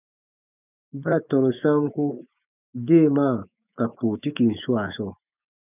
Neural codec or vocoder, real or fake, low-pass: autoencoder, 48 kHz, 128 numbers a frame, DAC-VAE, trained on Japanese speech; fake; 3.6 kHz